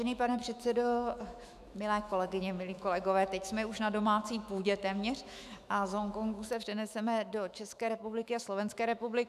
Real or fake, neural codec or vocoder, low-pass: fake; autoencoder, 48 kHz, 128 numbers a frame, DAC-VAE, trained on Japanese speech; 14.4 kHz